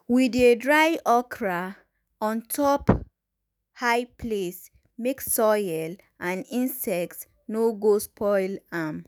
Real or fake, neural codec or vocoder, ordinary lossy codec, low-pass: fake; autoencoder, 48 kHz, 128 numbers a frame, DAC-VAE, trained on Japanese speech; none; none